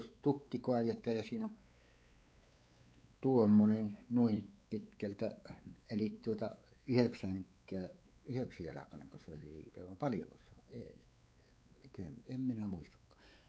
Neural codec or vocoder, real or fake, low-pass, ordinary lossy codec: codec, 16 kHz, 4 kbps, X-Codec, WavLM features, trained on Multilingual LibriSpeech; fake; none; none